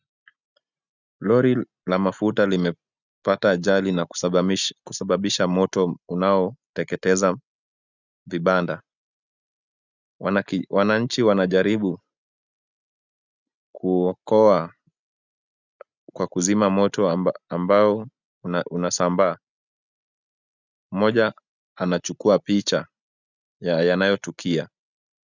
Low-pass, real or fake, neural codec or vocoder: 7.2 kHz; real; none